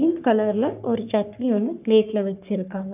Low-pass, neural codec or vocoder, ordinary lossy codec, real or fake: 3.6 kHz; codec, 44.1 kHz, 3.4 kbps, Pupu-Codec; none; fake